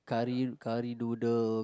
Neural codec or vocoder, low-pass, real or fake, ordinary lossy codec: none; none; real; none